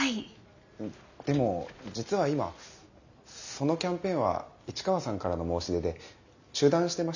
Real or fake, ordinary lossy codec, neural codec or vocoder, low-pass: real; none; none; 7.2 kHz